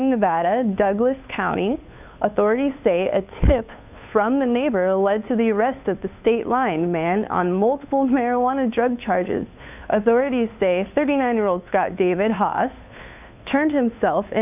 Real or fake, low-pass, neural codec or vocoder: fake; 3.6 kHz; codec, 16 kHz, 2 kbps, FunCodec, trained on Chinese and English, 25 frames a second